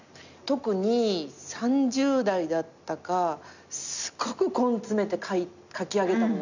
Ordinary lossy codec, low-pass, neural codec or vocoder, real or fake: none; 7.2 kHz; none; real